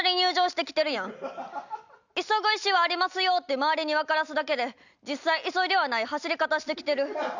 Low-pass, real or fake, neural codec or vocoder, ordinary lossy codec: 7.2 kHz; real; none; none